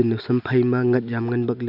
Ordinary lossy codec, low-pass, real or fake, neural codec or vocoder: none; 5.4 kHz; real; none